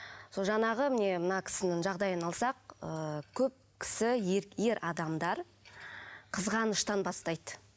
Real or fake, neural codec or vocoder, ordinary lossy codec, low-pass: real; none; none; none